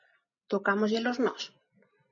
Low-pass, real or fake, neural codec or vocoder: 7.2 kHz; real; none